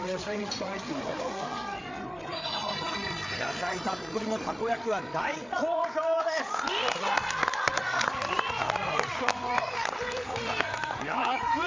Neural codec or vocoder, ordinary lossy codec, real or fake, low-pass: codec, 16 kHz, 8 kbps, FreqCodec, larger model; MP3, 48 kbps; fake; 7.2 kHz